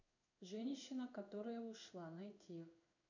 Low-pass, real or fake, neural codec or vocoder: 7.2 kHz; fake; codec, 16 kHz in and 24 kHz out, 1 kbps, XY-Tokenizer